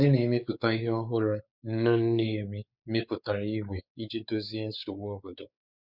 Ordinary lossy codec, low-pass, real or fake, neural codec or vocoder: Opus, 64 kbps; 5.4 kHz; fake; codec, 16 kHz, 4 kbps, X-Codec, WavLM features, trained on Multilingual LibriSpeech